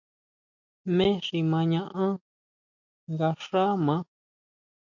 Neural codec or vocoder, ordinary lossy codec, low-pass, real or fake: none; MP3, 48 kbps; 7.2 kHz; real